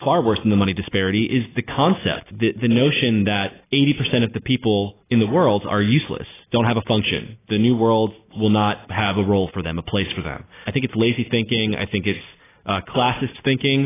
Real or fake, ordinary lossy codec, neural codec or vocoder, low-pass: real; AAC, 16 kbps; none; 3.6 kHz